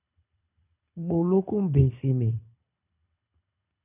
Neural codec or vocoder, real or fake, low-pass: codec, 24 kHz, 6 kbps, HILCodec; fake; 3.6 kHz